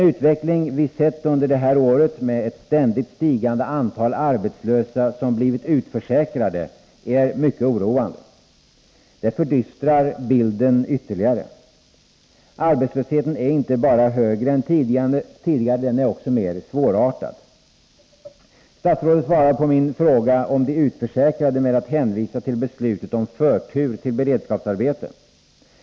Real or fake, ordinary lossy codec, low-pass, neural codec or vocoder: real; none; none; none